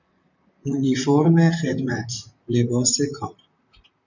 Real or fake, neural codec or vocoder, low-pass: fake; vocoder, 44.1 kHz, 128 mel bands, Pupu-Vocoder; 7.2 kHz